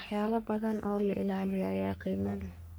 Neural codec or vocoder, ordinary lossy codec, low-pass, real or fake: codec, 44.1 kHz, 3.4 kbps, Pupu-Codec; none; none; fake